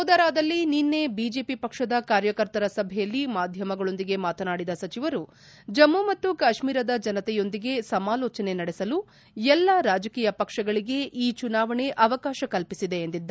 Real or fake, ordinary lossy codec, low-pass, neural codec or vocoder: real; none; none; none